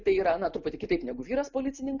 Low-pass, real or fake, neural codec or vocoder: 7.2 kHz; real; none